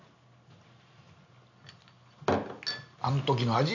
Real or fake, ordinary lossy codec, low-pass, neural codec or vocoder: real; none; 7.2 kHz; none